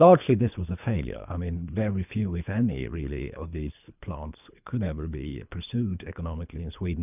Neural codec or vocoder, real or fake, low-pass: codec, 24 kHz, 3 kbps, HILCodec; fake; 3.6 kHz